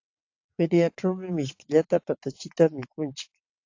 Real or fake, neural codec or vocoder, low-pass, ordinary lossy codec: fake; vocoder, 22.05 kHz, 80 mel bands, WaveNeXt; 7.2 kHz; AAC, 48 kbps